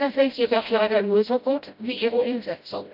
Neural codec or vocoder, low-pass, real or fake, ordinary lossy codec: codec, 16 kHz, 0.5 kbps, FreqCodec, smaller model; 5.4 kHz; fake; none